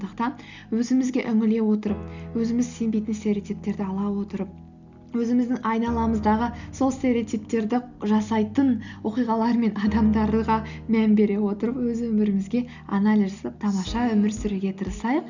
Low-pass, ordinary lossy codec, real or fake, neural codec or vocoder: 7.2 kHz; none; real; none